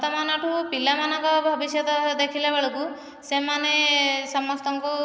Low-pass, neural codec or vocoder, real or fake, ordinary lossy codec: none; none; real; none